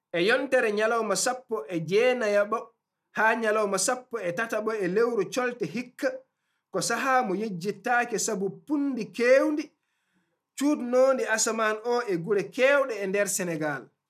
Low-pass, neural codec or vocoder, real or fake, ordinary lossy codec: 14.4 kHz; none; real; none